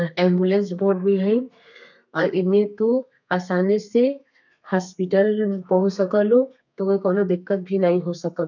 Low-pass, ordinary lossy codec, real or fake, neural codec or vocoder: 7.2 kHz; none; fake; codec, 32 kHz, 1.9 kbps, SNAC